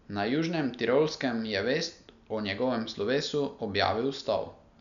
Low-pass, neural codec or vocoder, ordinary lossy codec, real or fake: 7.2 kHz; none; none; real